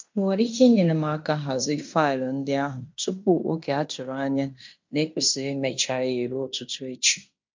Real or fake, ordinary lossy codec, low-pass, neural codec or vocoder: fake; MP3, 64 kbps; 7.2 kHz; codec, 16 kHz in and 24 kHz out, 0.9 kbps, LongCat-Audio-Codec, fine tuned four codebook decoder